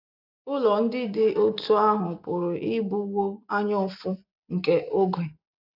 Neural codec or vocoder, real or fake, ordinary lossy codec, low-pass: none; real; none; 5.4 kHz